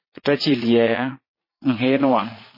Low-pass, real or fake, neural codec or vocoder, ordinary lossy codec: 5.4 kHz; fake; vocoder, 22.05 kHz, 80 mel bands, Vocos; MP3, 24 kbps